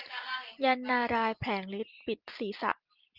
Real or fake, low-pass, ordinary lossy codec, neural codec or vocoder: real; 5.4 kHz; Opus, 32 kbps; none